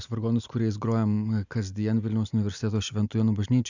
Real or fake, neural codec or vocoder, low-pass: real; none; 7.2 kHz